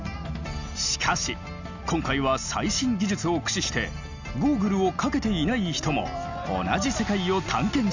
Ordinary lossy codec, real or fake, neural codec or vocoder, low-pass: none; real; none; 7.2 kHz